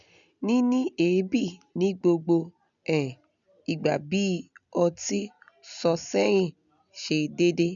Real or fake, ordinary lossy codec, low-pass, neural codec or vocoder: real; none; 7.2 kHz; none